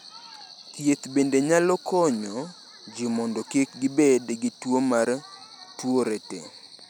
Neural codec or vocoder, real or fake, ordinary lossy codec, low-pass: none; real; none; none